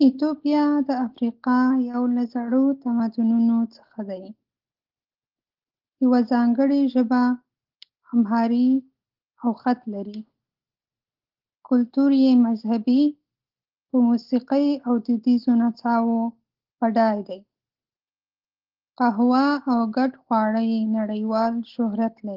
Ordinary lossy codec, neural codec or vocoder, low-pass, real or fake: Opus, 16 kbps; none; 5.4 kHz; real